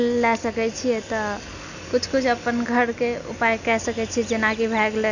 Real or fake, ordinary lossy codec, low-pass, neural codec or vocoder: real; none; 7.2 kHz; none